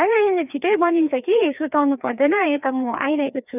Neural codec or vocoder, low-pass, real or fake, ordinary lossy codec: codec, 16 kHz, 2 kbps, FreqCodec, larger model; 3.6 kHz; fake; none